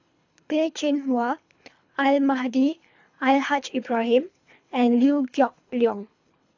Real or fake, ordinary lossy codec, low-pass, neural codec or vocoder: fake; AAC, 48 kbps; 7.2 kHz; codec, 24 kHz, 3 kbps, HILCodec